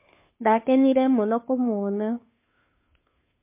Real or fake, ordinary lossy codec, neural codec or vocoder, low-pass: fake; MP3, 24 kbps; codec, 16 kHz, 2 kbps, FunCodec, trained on Chinese and English, 25 frames a second; 3.6 kHz